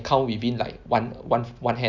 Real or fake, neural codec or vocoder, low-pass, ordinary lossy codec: real; none; 7.2 kHz; Opus, 64 kbps